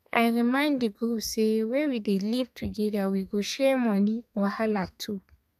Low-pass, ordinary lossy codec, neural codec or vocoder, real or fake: 14.4 kHz; none; codec, 32 kHz, 1.9 kbps, SNAC; fake